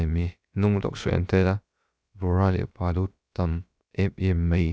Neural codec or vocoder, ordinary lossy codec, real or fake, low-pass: codec, 16 kHz, about 1 kbps, DyCAST, with the encoder's durations; none; fake; none